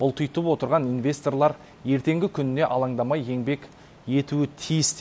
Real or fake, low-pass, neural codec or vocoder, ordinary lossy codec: real; none; none; none